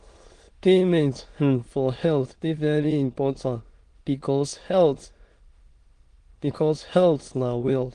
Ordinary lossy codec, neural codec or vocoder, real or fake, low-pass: Opus, 24 kbps; autoencoder, 22.05 kHz, a latent of 192 numbers a frame, VITS, trained on many speakers; fake; 9.9 kHz